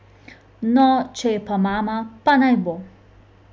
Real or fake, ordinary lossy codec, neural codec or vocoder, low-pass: real; none; none; none